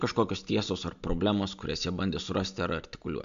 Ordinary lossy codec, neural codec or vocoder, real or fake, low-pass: MP3, 64 kbps; none; real; 7.2 kHz